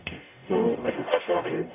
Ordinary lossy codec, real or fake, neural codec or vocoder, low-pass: none; fake; codec, 44.1 kHz, 0.9 kbps, DAC; 3.6 kHz